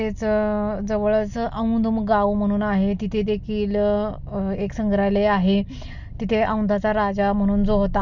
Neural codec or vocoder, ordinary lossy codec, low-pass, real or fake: none; none; 7.2 kHz; real